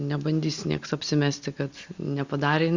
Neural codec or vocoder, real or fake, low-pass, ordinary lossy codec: none; real; 7.2 kHz; Opus, 64 kbps